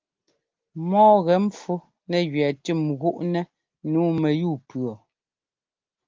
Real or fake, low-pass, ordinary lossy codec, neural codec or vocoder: real; 7.2 kHz; Opus, 32 kbps; none